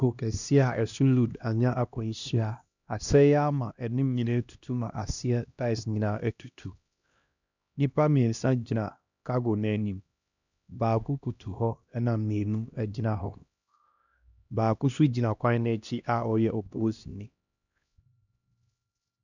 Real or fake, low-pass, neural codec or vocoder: fake; 7.2 kHz; codec, 16 kHz, 1 kbps, X-Codec, HuBERT features, trained on LibriSpeech